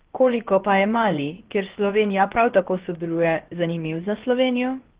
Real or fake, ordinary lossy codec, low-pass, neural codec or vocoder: fake; Opus, 16 kbps; 3.6 kHz; codec, 16 kHz, about 1 kbps, DyCAST, with the encoder's durations